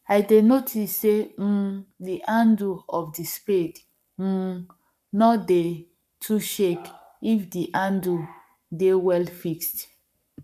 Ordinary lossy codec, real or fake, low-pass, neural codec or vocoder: none; fake; 14.4 kHz; codec, 44.1 kHz, 7.8 kbps, Pupu-Codec